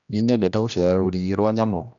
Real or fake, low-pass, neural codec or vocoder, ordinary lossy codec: fake; 7.2 kHz; codec, 16 kHz, 1 kbps, X-Codec, HuBERT features, trained on general audio; MP3, 96 kbps